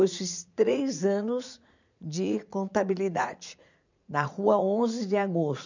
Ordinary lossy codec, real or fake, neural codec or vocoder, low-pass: none; fake; vocoder, 44.1 kHz, 80 mel bands, Vocos; 7.2 kHz